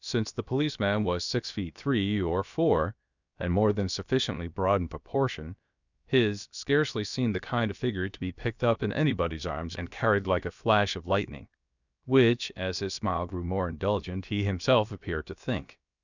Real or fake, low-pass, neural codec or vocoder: fake; 7.2 kHz; codec, 16 kHz, about 1 kbps, DyCAST, with the encoder's durations